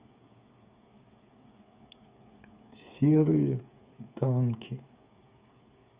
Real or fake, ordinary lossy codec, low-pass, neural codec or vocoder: fake; Opus, 64 kbps; 3.6 kHz; codec, 16 kHz, 8 kbps, FreqCodec, smaller model